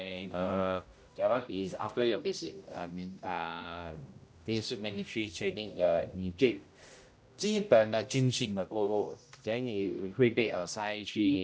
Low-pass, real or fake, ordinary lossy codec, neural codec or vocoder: none; fake; none; codec, 16 kHz, 0.5 kbps, X-Codec, HuBERT features, trained on general audio